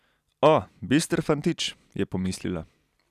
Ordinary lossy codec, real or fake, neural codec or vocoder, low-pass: none; real; none; 14.4 kHz